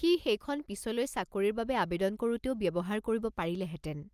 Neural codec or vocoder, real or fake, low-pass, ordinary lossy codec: none; real; 14.4 kHz; Opus, 32 kbps